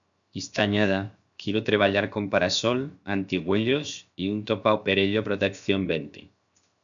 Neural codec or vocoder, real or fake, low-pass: codec, 16 kHz, 0.7 kbps, FocalCodec; fake; 7.2 kHz